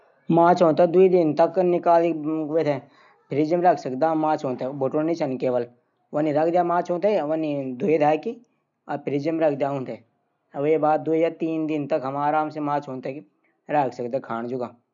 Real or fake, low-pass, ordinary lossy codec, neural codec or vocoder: real; 7.2 kHz; none; none